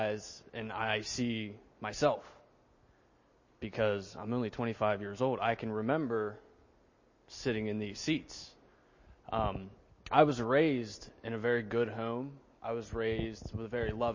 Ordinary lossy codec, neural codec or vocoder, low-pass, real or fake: MP3, 32 kbps; none; 7.2 kHz; real